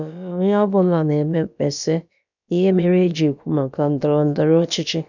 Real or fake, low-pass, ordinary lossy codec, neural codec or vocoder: fake; 7.2 kHz; none; codec, 16 kHz, about 1 kbps, DyCAST, with the encoder's durations